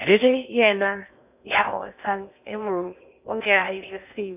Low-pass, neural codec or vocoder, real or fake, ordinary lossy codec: 3.6 kHz; codec, 16 kHz in and 24 kHz out, 0.6 kbps, FocalCodec, streaming, 4096 codes; fake; none